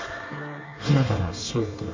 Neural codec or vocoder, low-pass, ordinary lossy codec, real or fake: codec, 24 kHz, 1 kbps, SNAC; 7.2 kHz; MP3, 64 kbps; fake